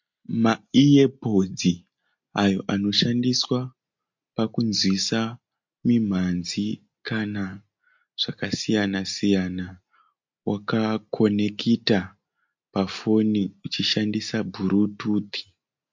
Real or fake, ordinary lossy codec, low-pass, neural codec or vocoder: real; MP3, 48 kbps; 7.2 kHz; none